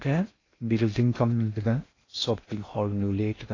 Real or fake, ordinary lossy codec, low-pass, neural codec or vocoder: fake; AAC, 32 kbps; 7.2 kHz; codec, 16 kHz in and 24 kHz out, 0.6 kbps, FocalCodec, streaming, 2048 codes